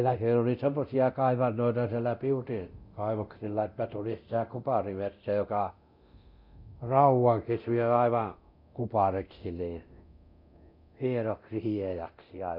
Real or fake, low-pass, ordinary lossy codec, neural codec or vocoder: fake; 5.4 kHz; none; codec, 24 kHz, 0.9 kbps, DualCodec